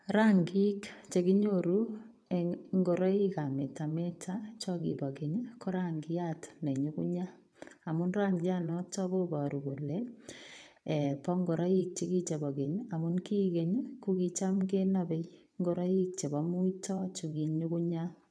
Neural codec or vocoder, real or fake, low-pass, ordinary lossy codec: vocoder, 22.05 kHz, 80 mel bands, WaveNeXt; fake; none; none